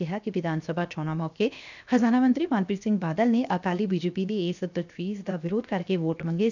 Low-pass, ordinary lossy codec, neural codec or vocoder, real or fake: 7.2 kHz; none; codec, 16 kHz, 0.7 kbps, FocalCodec; fake